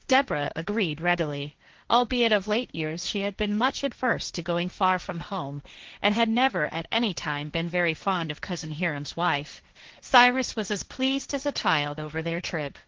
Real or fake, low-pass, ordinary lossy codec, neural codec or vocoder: fake; 7.2 kHz; Opus, 16 kbps; codec, 16 kHz, 1.1 kbps, Voila-Tokenizer